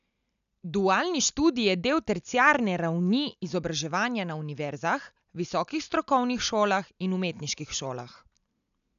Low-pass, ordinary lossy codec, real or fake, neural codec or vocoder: 7.2 kHz; none; real; none